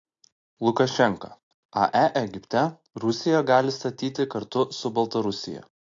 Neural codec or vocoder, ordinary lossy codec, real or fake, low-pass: none; AAC, 48 kbps; real; 7.2 kHz